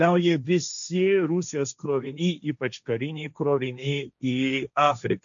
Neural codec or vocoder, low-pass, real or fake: codec, 16 kHz, 1.1 kbps, Voila-Tokenizer; 7.2 kHz; fake